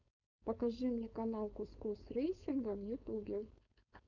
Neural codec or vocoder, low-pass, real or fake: codec, 16 kHz, 4.8 kbps, FACodec; 7.2 kHz; fake